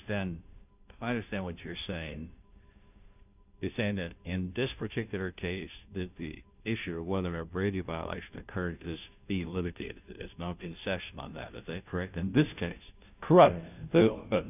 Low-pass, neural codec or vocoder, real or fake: 3.6 kHz; codec, 16 kHz, 0.5 kbps, FunCodec, trained on Chinese and English, 25 frames a second; fake